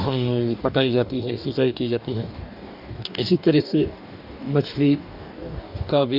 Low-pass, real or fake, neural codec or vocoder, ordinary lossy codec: 5.4 kHz; fake; codec, 44.1 kHz, 2.6 kbps, DAC; none